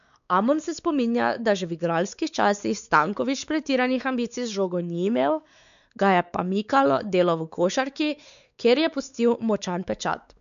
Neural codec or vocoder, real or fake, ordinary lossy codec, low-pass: codec, 16 kHz, 4 kbps, X-Codec, WavLM features, trained on Multilingual LibriSpeech; fake; none; 7.2 kHz